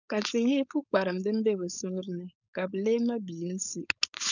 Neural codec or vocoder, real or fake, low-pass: codec, 16 kHz, 4.8 kbps, FACodec; fake; 7.2 kHz